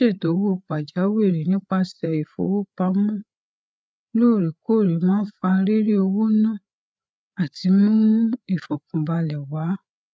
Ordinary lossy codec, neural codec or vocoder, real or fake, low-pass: none; codec, 16 kHz, 8 kbps, FreqCodec, larger model; fake; none